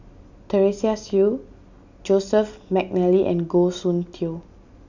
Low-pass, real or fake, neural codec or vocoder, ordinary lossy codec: 7.2 kHz; real; none; none